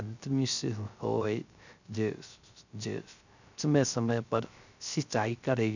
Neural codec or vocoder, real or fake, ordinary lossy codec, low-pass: codec, 16 kHz, 0.3 kbps, FocalCodec; fake; none; 7.2 kHz